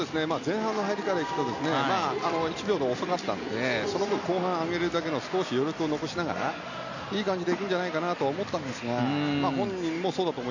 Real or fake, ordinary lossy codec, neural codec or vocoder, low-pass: real; none; none; 7.2 kHz